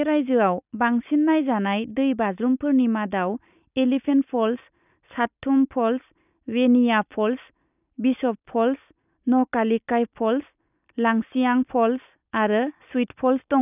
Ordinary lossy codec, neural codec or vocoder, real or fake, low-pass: none; none; real; 3.6 kHz